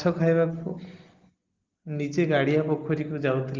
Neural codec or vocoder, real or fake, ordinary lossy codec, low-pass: none; real; Opus, 16 kbps; 7.2 kHz